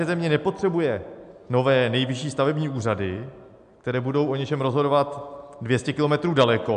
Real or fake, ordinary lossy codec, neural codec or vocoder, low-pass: real; AAC, 96 kbps; none; 9.9 kHz